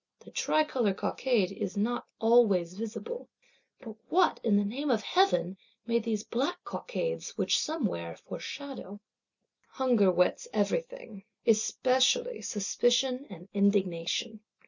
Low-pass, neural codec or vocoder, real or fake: 7.2 kHz; none; real